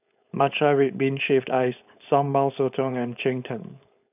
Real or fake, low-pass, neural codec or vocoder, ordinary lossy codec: fake; 3.6 kHz; codec, 16 kHz, 4.8 kbps, FACodec; none